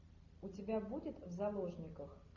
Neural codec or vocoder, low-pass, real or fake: none; 7.2 kHz; real